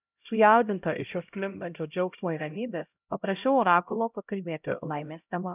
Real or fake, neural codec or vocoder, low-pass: fake; codec, 16 kHz, 0.5 kbps, X-Codec, HuBERT features, trained on LibriSpeech; 3.6 kHz